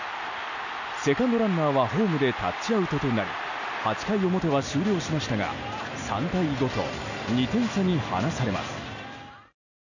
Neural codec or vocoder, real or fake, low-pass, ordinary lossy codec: none; real; 7.2 kHz; none